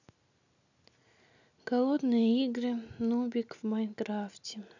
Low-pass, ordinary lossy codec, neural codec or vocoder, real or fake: 7.2 kHz; none; none; real